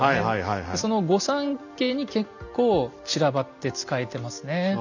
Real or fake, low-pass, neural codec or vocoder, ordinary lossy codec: real; 7.2 kHz; none; none